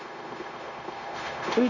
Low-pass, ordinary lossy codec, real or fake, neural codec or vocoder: 7.2 kHz; none; fake; codec, 16 kHz, 0.9 kbps, LongCat-Audio-Codec